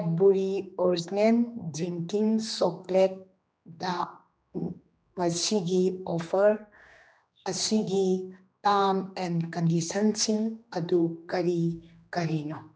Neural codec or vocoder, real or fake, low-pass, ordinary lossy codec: codec, 16 kHz, 2 kbps, X-Codec, HuBERT features, trained on general audio; fake; none; none